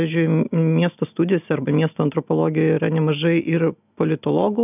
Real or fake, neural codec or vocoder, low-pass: real; none; 3.6 kHz